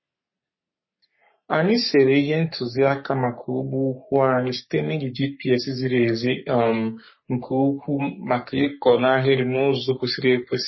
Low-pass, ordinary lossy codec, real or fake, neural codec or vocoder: 7.2 kHz; MP3, 24 kbps; fake; codec, 44.1 kHz, 3.4 kbps, Pupu-Codec